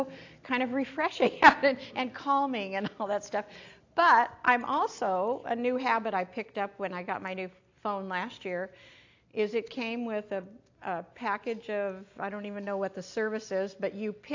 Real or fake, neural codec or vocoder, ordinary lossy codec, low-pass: real; none; AAC, 48 kbps; 7.2 kHz